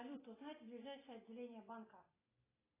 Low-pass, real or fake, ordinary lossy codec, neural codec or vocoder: 3.6 kHz; real; AAC, 16 kbps; none